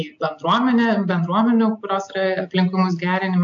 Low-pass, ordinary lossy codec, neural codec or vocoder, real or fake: 7.2 kHz; AAC, 64 kbps; none; real